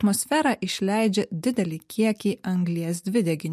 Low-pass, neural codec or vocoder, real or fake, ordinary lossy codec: 14.4 kHz; none; real; MP3, 64 kbps